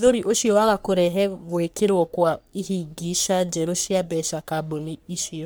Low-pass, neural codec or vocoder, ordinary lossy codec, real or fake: none; codec, 44.1 kHz, 3.4 kbps, Pupu-Codec; none; fake